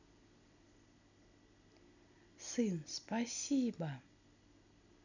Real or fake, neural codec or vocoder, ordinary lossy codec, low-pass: real; none; none; 7.2 kHz